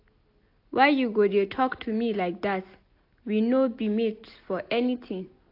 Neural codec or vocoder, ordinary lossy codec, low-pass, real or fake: none; AAC, 32 kbps; 5.4 kHz; real